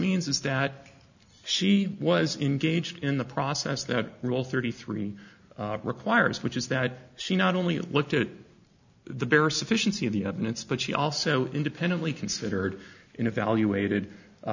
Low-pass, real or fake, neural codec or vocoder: 7.2 kHz; real; none